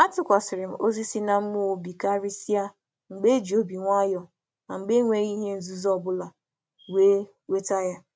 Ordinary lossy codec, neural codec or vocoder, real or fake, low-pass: none; none; real; none